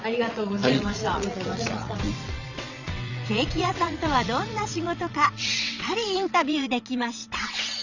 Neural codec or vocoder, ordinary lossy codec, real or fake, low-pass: vocoder, 22.05 kHz, 80 mel bands, WaveNeXt; none; fake; 7.2 kHz